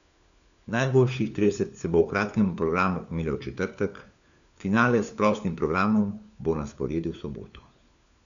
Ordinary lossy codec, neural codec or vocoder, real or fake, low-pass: none; codec, 16 kHz, 4 kbps, FunCodec, trained on LibriTTS, 50 frames a second; fake; 7.2 kHz